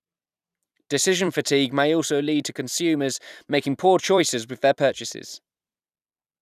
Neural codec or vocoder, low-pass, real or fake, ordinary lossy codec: vocoder, 44.1 kHz, 128 mel bands every 256 samples, BigVGAN v2; 14.4 kHz; fake; none